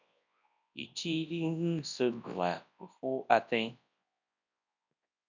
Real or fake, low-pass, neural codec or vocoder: fake; 7.2 kHz; codec, 24 kHz, 0.9 kbps, WavTokenizer, large speech release